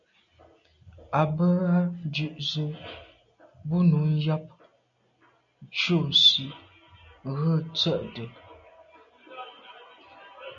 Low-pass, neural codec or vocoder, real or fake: 7.2 kHz; none; real